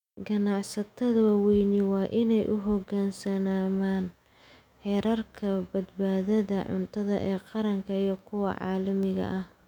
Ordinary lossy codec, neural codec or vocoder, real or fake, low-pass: none; autoencoder, 48 kHz, 128 numbers a frame, DAC-VAE, trained on Japanese speech; fake; 19.8 kHz